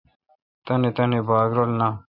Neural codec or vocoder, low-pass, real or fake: none; 5.4 kHz; real